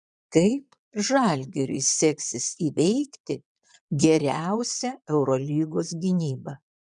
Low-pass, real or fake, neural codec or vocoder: 9.9 kHz; fake; vocoder, 22.05 kHz, 80 mel bands, Vocos